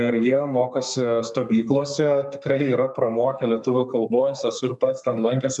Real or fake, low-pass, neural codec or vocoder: fake; 10.8 kHz; codec, 32 kHz, 1.9 kbps, SNAC